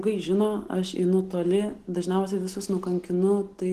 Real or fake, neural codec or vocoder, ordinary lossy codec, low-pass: real; none; Opus, 16 kbps; 14.4 kHz